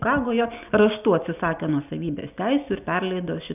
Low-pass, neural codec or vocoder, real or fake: 3.6 kHz; none; real